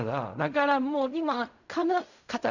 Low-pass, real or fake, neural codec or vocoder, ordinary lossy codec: 7.2 kHz; fake; codec, 16 kHz in and 24 kHz out, 0.4 kbps, LongCat-Audio-Codec, fine tuned four codebook decoder; none